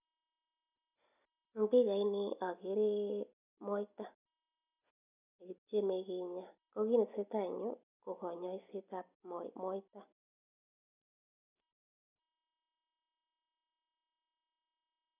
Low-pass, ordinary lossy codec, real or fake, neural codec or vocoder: 3.6 kHz; none; real; none